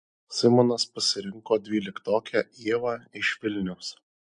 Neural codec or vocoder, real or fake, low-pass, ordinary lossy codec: none; real; 9.9 kHz; MP3, 64 kbps